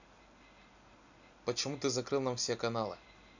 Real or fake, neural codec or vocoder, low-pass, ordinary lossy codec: real; none; 7.2 kHz; none